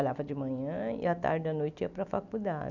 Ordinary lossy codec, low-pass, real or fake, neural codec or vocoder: none; 7.2 kHz; real; none